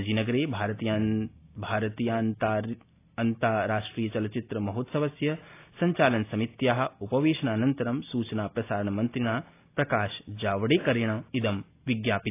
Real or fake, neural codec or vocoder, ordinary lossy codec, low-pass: real; none; AAC, 24 kbps; 3.6 kHz